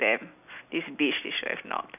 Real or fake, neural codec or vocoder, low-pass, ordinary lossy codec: real; none; 3.6 kHz; none